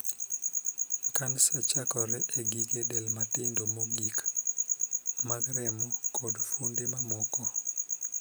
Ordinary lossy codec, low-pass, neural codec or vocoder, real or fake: none; none; none; real